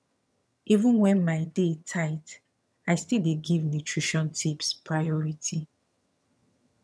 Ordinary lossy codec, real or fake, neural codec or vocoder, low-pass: none; fake; vocoder, 22.05 kHz, 80 mel bands, HiFi-GAN; none